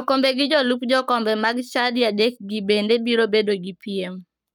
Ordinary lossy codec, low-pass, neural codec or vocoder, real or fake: none; 19.8 kHz; codec, 44.1 kHz, 7.8 kbps, Pupu-Codec; fake